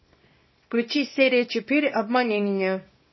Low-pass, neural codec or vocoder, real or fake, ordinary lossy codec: 7.2 kHz; codec, 24 kHz, 0.9 kbps, WavTokenizer, small release; fake; MP3, 24 kbps